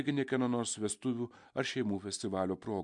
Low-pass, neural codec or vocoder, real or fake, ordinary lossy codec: 10.8 kHz; none; real; MP3, 64 kbps